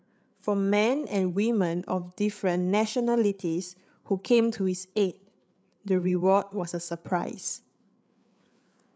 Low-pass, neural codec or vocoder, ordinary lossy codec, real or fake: none; codec, 16 kHz, 8 kbps, FreqCodec, larger model; none; fake